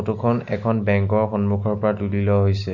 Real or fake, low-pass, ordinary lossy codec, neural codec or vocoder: real; 7.2 kHz; none; none